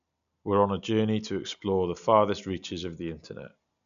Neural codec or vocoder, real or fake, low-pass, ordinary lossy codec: none; real; 7.2 kHz; none